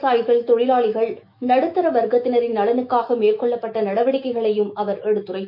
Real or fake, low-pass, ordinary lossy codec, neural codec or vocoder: fake; 5.4 kHz; none; autoencoder, 48 kHz, 128 numbers a frame, DAC-VAE, trained on Japanese speech